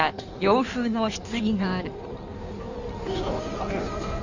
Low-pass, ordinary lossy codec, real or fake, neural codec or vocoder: 7.2 kHz; none; fake; codec, 16 kHz in and 24 kHz out, 1.1 kbps, FireRedTTS-2 codec